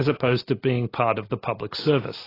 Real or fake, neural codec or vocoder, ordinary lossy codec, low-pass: real; none; AAC, 24 kbps; 5.4 kHz